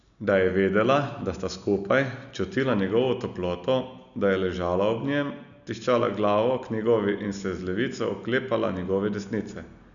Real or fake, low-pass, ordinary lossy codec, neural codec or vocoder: real; 7.2 kHz; none; none